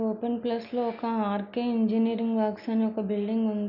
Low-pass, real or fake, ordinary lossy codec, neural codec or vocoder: 5.4 kHz; real; none; none